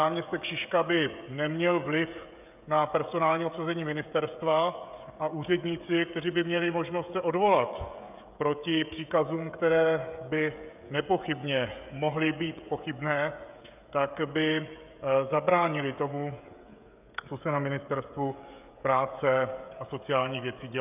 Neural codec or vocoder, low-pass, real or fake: codec, 16 kHz, 16 kbps, FreqCodec, smaller model; 3.6 kHz; fake